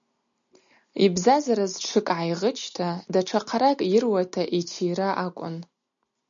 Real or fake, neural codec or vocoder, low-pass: real; none; 7.2 kHz